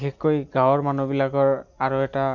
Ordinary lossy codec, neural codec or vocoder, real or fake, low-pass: none; none; real; 7.2 kHz